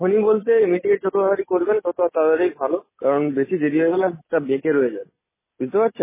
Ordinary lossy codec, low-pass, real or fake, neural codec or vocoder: MP3, 16 kbps; 3.6 kHz; real; none